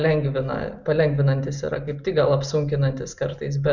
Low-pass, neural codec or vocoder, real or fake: 7.2 kHz; none; real